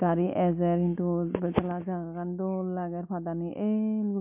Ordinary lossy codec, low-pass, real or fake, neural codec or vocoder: MP3, 32 kbps; 3.6 kHz; real; none